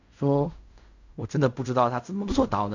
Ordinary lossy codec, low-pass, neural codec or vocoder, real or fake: none; 7.2 kHz; codec, 16 kHz in and 24 kHz out, 0.4 kbps, LongCat-Audio-Codec, fine tuned four codebook decoder; fake